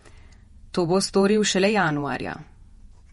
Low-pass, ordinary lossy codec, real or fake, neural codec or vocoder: 19.8 kHz; MP3, 48 kbps; fake; vocoder, 48 kHz, 128 mel bands, Vocos